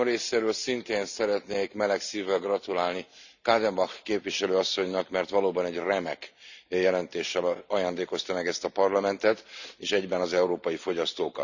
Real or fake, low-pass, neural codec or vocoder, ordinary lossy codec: fake; 7.2 kHz; vocoder, 44.1 kHz, 128 mel bands every 256 samples, BigVGAN v2; none